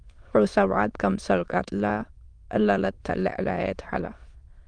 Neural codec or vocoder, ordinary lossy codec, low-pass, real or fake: autoencoder, 22.05 kHz, a latent of 192 numbers a frame, VITS, trained on many speakers; Opus, 24 kbps; 9.9 kHz; fake